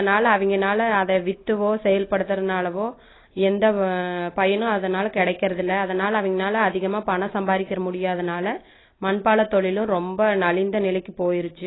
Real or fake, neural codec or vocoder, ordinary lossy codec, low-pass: real; none; AAC, 16 kbps; 7.2 kHz